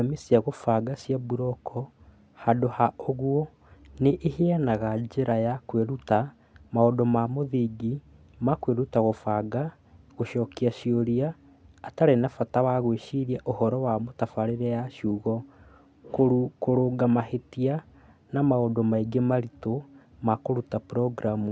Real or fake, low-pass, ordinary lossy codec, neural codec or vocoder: real; none; none; none